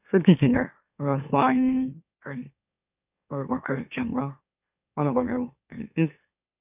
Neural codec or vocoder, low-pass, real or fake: autoencoder, 44.1 kHz, a latent of 192 numbers a frame, MeloTTS; 3.6 kHz; fake